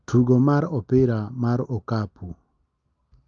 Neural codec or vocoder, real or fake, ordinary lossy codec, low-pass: none; real; Opus, 32 kbps; 7.2 kHz